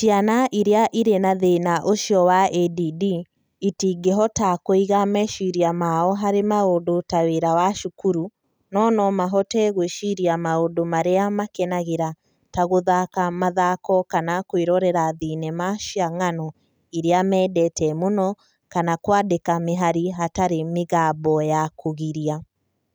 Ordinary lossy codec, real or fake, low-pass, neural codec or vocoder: none; real; none; none